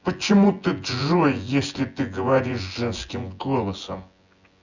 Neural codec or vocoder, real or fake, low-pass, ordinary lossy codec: vocoder, 24 kHz, 100 mel bands, Vocos; fake; 7.2 kHz; Opus, 64 kbps